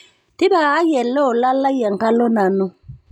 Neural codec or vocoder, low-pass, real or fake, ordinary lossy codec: none; 19.8 kHz; real; none